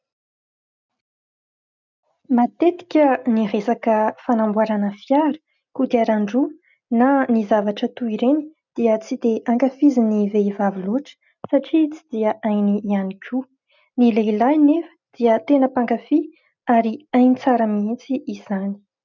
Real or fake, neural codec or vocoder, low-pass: real; none; 7.2 kHz